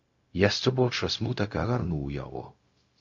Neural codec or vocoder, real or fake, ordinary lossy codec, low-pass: codec, 16 kHz, 0.4 kbps, LongCat-Audio-Codec; fake; MP3, 48 kbps; 7.2 kHz